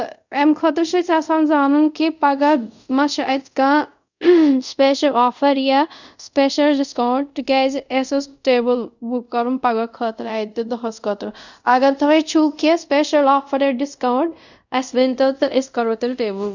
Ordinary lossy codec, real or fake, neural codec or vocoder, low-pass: none; fake; codec, 24 kHz, 0.5 kbps, DualCodec; 7.2 kHz